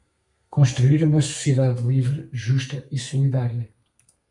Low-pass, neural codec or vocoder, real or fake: 10.8 kHz; codec, 32 kHz, 1.9 kbps, SNAC; fake